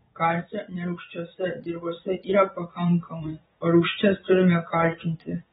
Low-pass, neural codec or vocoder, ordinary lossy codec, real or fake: 9.9 kHz; vocoder, 22.05 kHz, 80 mel bands, Vocos; AAC, 16 kbps; fake